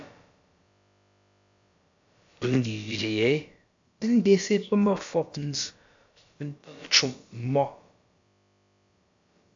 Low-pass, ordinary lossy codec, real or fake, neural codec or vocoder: 7.2 kHz; AAC, 64 kbps; fake; codec, 16 kHz, about 1 kbps, DyCAST, with the encoder's durations